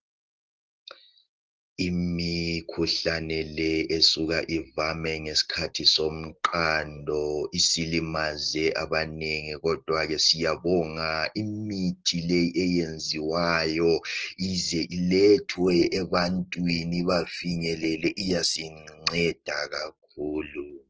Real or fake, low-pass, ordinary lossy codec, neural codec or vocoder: real; 7.2 kHz; Opus, 16 kbps; none